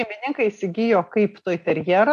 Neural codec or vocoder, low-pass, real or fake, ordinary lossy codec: none; 14.4 kHz; real; MP3, 64 kbps